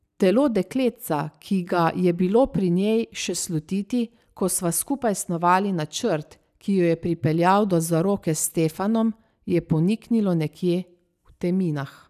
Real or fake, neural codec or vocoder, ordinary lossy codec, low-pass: real; none; none; 14.4 kHz